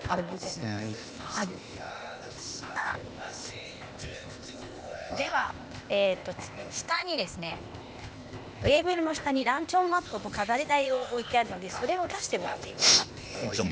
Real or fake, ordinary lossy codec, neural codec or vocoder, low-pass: fake; none; codec, 16 kHz, 0.8 kbps, ZipCodec; none